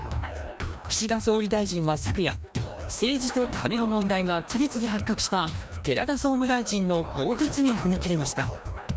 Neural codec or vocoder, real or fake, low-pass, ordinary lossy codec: codec, 16 kHz, 1 kbps, FreqCodec, larger model; fake; none; none